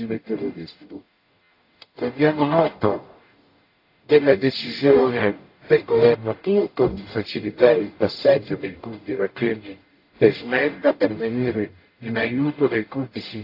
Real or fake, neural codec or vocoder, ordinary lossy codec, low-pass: fake; codec, 44.1 kHz, 0.9 kbps, DAC; AAC, 32 kbps; 5.4 kHz